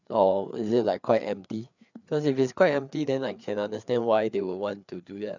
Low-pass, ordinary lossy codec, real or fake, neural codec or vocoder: 7.2 kHz; none; fake; codec, 16 kHz, 4 kbps, FreqCodec, larger model